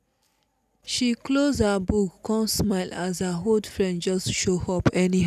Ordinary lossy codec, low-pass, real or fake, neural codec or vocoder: none; 14.4 kHz; real; none